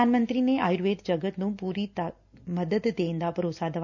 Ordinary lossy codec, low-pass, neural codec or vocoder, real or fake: none; 7.2 kHz; none; real